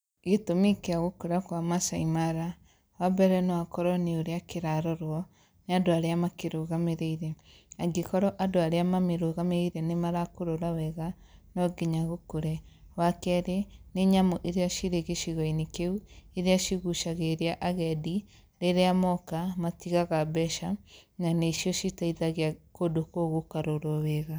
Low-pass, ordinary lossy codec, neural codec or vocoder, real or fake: none; none; none; real